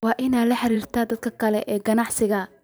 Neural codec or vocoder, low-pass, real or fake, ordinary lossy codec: vocoder, 44.1 kHz, 128 mel bands every 512 samples, BigVGAN v2; none; fake; none